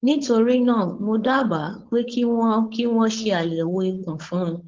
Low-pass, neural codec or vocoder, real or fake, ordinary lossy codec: 7.2 kHz; codec, 16 kHz, 4.8 kbps, FACodec; fake; Opus, 16 kbps